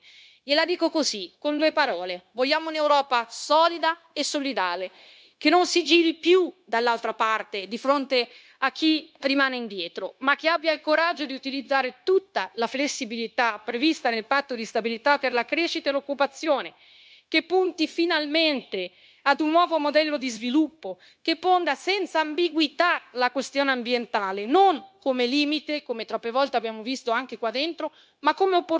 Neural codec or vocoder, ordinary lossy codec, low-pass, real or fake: codec, 16 kHz, 0.9 kbps, LongCat-Audio-Codec; none; none; fake